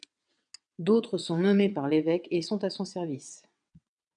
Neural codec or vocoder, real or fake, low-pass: vocoder, 22.05 kHz, 80 mel bands, WaveNeXt; fake; 9.9 kHz